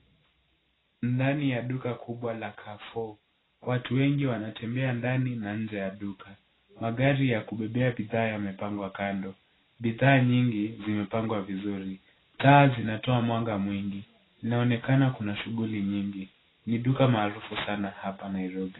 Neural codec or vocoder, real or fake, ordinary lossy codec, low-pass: none; real; AAC, 16 kbps; 7.2 kHz